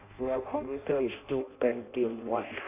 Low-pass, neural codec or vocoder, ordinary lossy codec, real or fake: 3.6 kHz; codec, 16 kHz in and 24 kHz out, 0.6 kbps, FireRedTTS-2 codec; none; fake